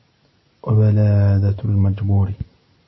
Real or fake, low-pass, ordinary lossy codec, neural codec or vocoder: real; 7.2 kHz; MP3, 24 kbps; none